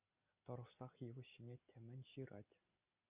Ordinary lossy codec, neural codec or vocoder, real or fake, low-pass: Opus, 64 kbps; none; real; 3.6 kHz